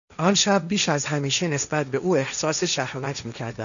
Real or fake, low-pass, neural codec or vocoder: fake; 7.2 kHz; codec, 16 kHz, 1.1 kbps, Voila-Tokenizer